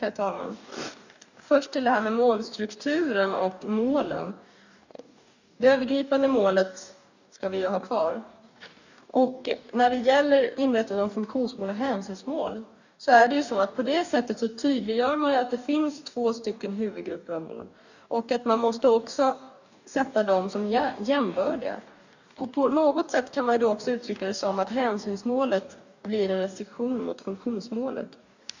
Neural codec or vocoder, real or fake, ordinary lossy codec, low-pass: codec, 44.1 kHz, 2.6 kbps, DAC; fake; none; 7.2 kHz